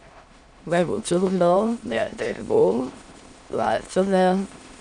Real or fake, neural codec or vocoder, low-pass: fake; autoencoder, 22.05 kHz, a latent of 192 numbers a frame, VITS, trained on many speakers; 9.9 kHz